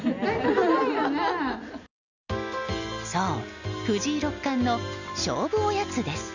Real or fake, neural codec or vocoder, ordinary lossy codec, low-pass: real; none; none; 7.2 kHz